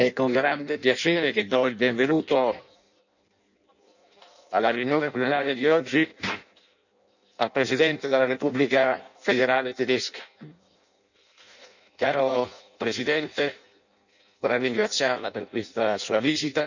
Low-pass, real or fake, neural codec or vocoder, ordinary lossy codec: 7.2 kHz; fake; codec, 16 kHz in and 24 kHz out, 0.6 kbps, FireRedTTS-2 codec; none